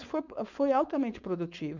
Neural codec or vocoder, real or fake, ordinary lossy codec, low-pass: codec, 16 kHz, 4.8 kbps, FACodec; fake; none; 7.2 kHz